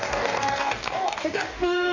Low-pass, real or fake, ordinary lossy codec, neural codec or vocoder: 7.2 kHz; fake; none; codec, 44.1 kHz, 2.6 kbps, DAC